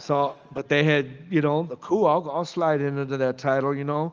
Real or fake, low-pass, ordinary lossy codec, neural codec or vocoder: real; 7.2 kHz; Opus, 24 kbps; none